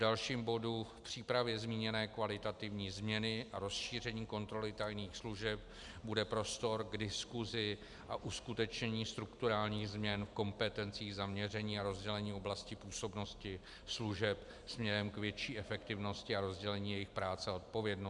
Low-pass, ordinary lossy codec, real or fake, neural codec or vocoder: 10.8 kHz; MP3, 96 kbps; real; none